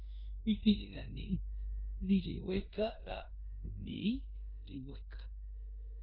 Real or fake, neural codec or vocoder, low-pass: fake; codec, 16 kHz in and 24 kHz out, 0.9 kbps, LongCat-Audio-Codec, four codebook decoder; 5.4 kHz